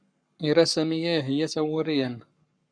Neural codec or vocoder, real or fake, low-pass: codec, 44.1 kHz, 7.8 kbps, Pupu-Codec; fake; 9.9 kHz